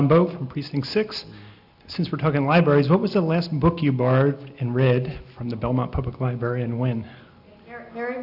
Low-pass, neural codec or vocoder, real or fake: 5.4 kHz; none; real